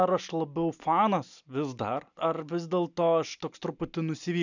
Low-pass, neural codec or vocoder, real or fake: 7.2 kHz; none; real